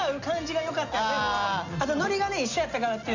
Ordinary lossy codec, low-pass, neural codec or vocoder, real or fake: none; 7.2 kHz; none; real